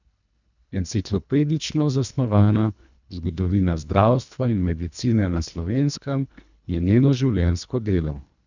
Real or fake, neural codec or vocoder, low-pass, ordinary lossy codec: fake; codec, 24 kHz, 1.5 kbps, HILCodec; 7.2 kHz; none